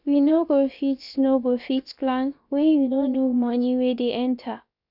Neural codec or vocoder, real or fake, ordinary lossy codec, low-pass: codec, 16 kHz, about 1 kbps, DyCAST, with the encoder's durations; fake; none; 5.4 kHz